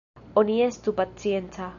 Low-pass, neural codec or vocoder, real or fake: 7.2 kHz; none; real